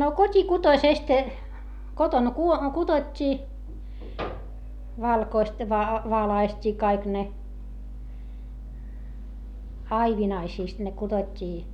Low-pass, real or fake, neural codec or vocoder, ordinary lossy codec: 19.8 kHz; real; none; none